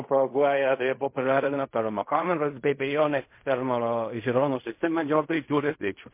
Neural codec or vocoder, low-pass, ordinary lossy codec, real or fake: codec, 16 kHz in and 24 kHz out, 0.4 kbps, LongCat-Audio-Codec, fine tuned four codebook decoder; 3.6 kHz; MP3, 24 kbps; fake